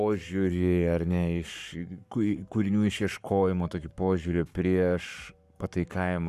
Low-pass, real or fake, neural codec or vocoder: 14.4 kHz; fake; codec, 44.1 kHz, 7.8 kbps, Pupu-Codec